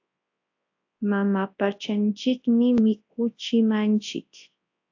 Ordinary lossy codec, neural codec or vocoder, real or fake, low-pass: AAC, 48 kbps; codec, 24 kHz, 0.9 kbps, WavTokenizer, large speech release; fake; 7.2 kHz